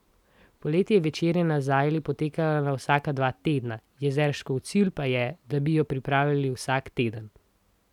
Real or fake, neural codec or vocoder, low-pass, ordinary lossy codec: real; none; 19.8 kHz; none